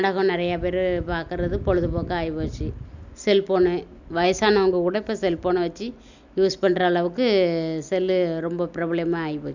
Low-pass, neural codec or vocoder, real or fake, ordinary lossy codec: 7.2 kHz; none; real; none